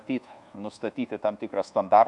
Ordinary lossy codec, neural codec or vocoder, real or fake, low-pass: Opus, 64 kbps; codec, 24 kHz, 1.2 kbps, DualCodec; fake; 10.8 kHz